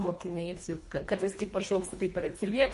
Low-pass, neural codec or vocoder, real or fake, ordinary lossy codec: 10.8 kHz; codec, 24 kHz, 1.5 kbps, HILCodec; fake; MP3, 48 kbps